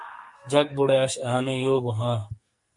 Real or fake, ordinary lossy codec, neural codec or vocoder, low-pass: fake; MP3, 48 kbps; codec, 44.1 kHz, 2.6 kbps, SNAC; 10.8 kHz